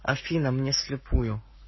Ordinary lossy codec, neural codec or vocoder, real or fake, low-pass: MP3, 24 kbps; codec, 44.1 kHz, 7.8 kbps, Pupu-Codec; fake; 7.2 kHz